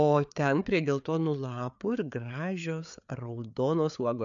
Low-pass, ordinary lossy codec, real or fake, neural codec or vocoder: 7.2 kHz; MP3, 96 kbps; fake; codec, 16 kHz, 4 kbps, FreqCodec, larger model